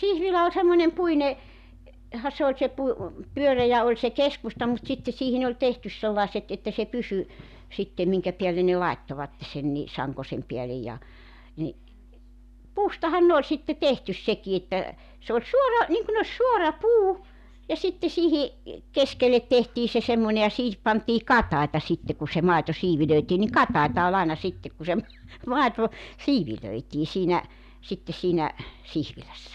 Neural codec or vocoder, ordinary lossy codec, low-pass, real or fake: none; none; 14.4 kHz; real